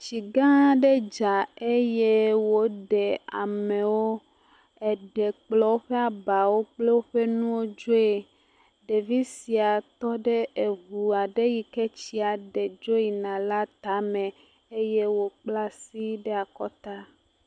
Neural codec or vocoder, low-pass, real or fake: none; 9.9 kHz; real